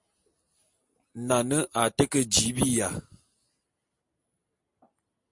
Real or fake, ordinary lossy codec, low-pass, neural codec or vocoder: real; MP3, 48 kbps; 10.8 kHz; none